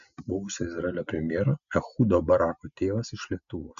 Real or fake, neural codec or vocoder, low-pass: real; none; 7.2 kHz